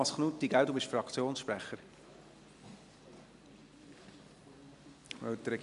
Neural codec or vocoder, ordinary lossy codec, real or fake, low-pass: none; none; real; 10.8 kHz